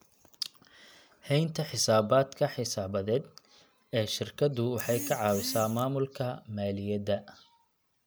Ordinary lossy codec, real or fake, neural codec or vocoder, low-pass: none; real; none; none